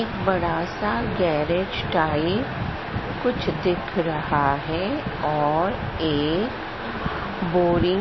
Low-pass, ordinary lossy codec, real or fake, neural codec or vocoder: 7.2 kHz; MP3, 24 kbps; real; none